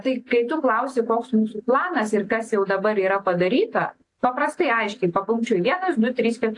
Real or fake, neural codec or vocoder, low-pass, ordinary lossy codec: real; none; 10.8 kHz; AAC, 48 kbps